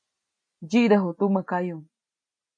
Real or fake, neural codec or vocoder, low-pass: real; none; 9.9 kHz